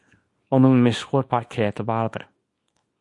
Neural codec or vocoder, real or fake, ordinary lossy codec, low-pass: codec, 24 kHz, 0.9 kbps, WavTokenizer, small release; fake; MP3, 48 kbps; 10.8 kHz